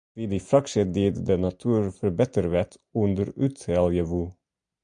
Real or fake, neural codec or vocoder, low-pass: real; none; 9.9 kHz